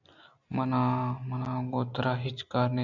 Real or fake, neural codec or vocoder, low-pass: real; none; 7.2 kHz